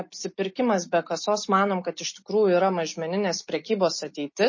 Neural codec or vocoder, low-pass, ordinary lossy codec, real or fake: none; 7.2 kHz; MP3, 32 kbps; real